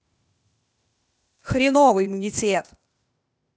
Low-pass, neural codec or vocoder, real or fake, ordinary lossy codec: none; codec, 16 kHz, 0.8 kbps, ZipCodec; fake; none